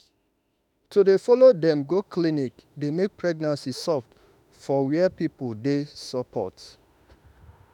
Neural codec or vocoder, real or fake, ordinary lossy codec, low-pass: autoencoder, 48 kHz, 32 numbers a frame, DAC-VAE, trained on Japanese speech; fake; none; 19.8 kHz